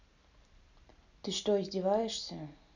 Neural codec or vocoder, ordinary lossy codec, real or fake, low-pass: none; none; real; 7.2 kHz